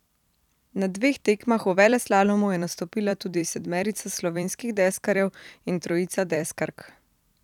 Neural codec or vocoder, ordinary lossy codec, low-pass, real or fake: vocoder, 44.1 kHz, 128 mel bands every 256 samples, BigVGAN v2; none; 19.8 kHz; fake